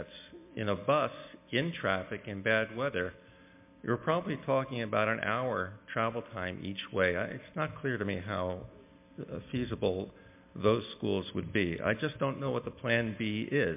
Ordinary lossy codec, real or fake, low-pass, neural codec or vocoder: MP3, 32 kbps; real; 3.6 kHz; none